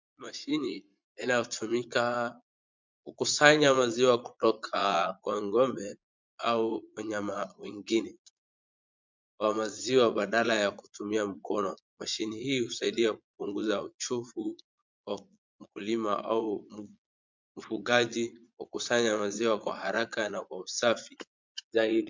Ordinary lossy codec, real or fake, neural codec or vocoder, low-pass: MP3, 64 kbps; fake; vocoder, 22.05 kHz, 80 mel bands, Vocos; 7.2 kHz